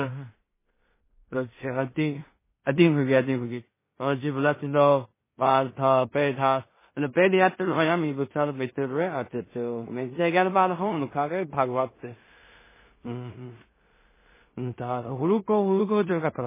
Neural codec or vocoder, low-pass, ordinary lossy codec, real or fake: codec, 16 kHz in and 24 kHz out, 0.4 kbps, LongCat-Audio-Codec, two codebook decoder; 3.6 kHz; MP3, 16 kbps; fake